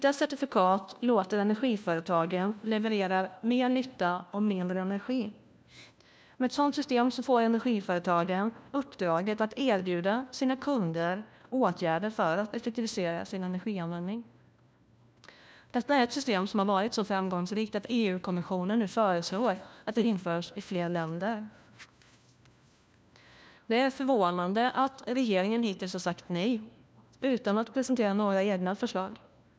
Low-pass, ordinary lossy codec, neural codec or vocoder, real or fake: none; none; codec, 16 kHz, 1 kbps, FunCodec, trained on LibriTTS, 50 frames a second; fake